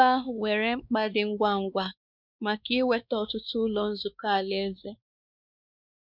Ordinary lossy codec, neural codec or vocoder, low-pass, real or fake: none; codec, 16 kHz, 2 kbps, X-Codec, WavLM features, trained on Multilingual LibriSpeech; 5.4 kHz; fake